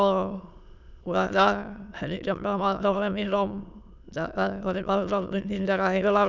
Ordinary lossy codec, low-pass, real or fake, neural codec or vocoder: none; 7.2 kHz; fake; autoencoder, 22.05 kHz, a latent of 192 numbers a frame, VITS, trained on many speakers